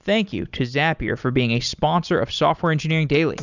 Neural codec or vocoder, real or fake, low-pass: none; real; 7.2 kHz